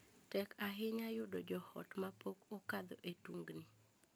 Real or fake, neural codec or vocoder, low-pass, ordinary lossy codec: real; none; none; none